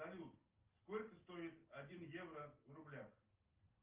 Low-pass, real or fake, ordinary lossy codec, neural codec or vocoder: 3.6 kHz; real; Opus, 24 kbps; none